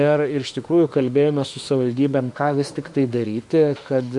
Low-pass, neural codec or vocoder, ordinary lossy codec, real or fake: 10.8 kHz; autoencoder, 48 kHz, 32 numbers a frame, DAC-VAE, trained on Japanese speech; MP3, 64 kbps; fake